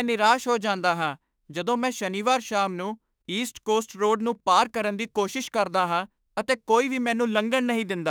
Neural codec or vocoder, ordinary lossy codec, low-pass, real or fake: autoencoder, 48 kHz, 32 numbers a frame, DAC-VAE, trained on Japanese speech; none; none; fake